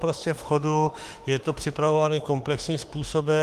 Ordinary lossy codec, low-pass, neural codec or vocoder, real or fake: Opus, 24 kbps; 14.4 kHz; autoencoder, 48 kHz, 32 numbers a frame, DAC-VAE, trained on Japanese speech; fake